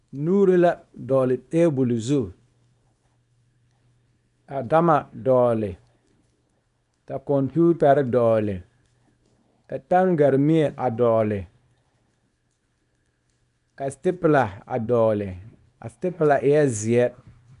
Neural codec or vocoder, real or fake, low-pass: codec, 24 kHz, 0.9 kbps, WavTokenizer, small release; fake; 10.8 kHz